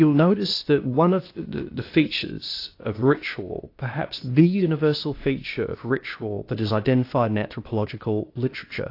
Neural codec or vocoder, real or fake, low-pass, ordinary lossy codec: codec, 16 kHz, 0.8 kbps, ZipCodec; fake; 5.4 kHz; AAC, 32 kbps